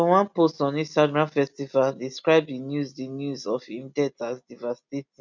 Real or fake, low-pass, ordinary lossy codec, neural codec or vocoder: real; 7.2 kHz; none; none